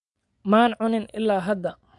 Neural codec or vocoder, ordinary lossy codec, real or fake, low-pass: vocoder, 24 kHz, 100 mel bands, Vocos; none; fake; 10.8 kHz